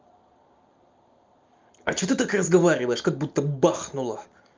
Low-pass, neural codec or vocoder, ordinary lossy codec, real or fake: 7.2 kHz; none; Opus, 32 kbps; real